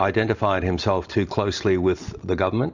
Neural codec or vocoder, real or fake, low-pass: none; real; 7.2 kHz